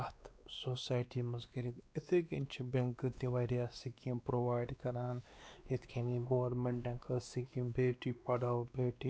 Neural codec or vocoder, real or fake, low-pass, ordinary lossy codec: codec, 16 kHz, 2 kbps, X-Codec, WavLM features, trained on Multilingual LibriSpeech; fake; none; none